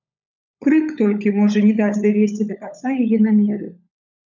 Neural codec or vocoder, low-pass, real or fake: codec, 16 kHz, 16 kbps, FunCodec, trained on LibriTTS, 50 frames a second; 7.2 kHz; fake